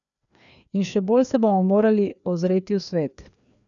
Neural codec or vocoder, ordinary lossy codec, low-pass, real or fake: codec, 16 kHz, 2 kbps, FreqCodec, larger model; none; 7.2 kHz; fake